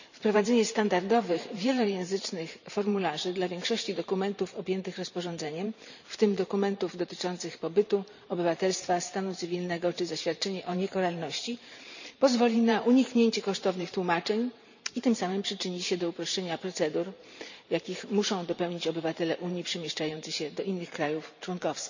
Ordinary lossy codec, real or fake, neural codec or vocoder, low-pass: none; fake; vocoder, 44.1 kHz, 128 mel bands every 512 samples, BigVGAN v2; 7.2 kHz